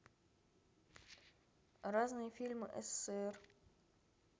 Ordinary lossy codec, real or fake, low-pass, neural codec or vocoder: none; real; none; none